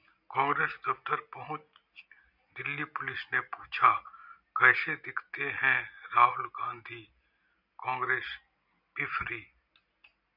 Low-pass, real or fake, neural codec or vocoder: 5.4 kHz; real; none